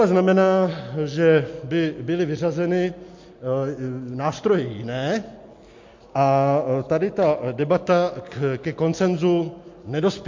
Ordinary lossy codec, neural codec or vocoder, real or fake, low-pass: MP3, 48 kbps; none; real; 7.2 kHz